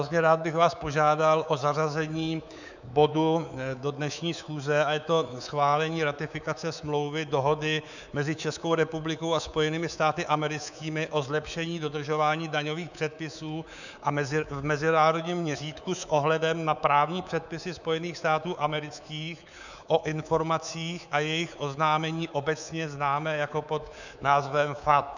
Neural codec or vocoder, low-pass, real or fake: codec, 24 kHz, 3.1 kbps, DualCodec; 7.2 kHz; fake